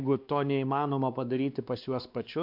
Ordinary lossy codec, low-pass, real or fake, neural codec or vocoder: MP3, 48 kbps; 5.4 kHz; fake; codec, 16 kHz, 2 kbps, X-Codec, WavLM features, trained on Multilingual LibriSpeech